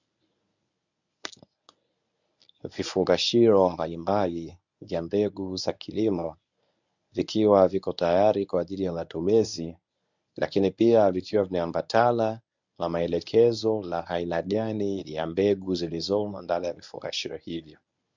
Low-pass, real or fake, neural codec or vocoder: 7.2 kHz; fake; codec, 24 kHz, 0.9 kbps, WavTokenizer, medium speech release version 1